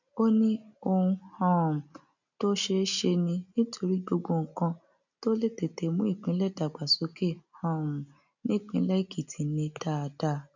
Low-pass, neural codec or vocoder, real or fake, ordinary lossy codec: 7.2 kHz; none; real; none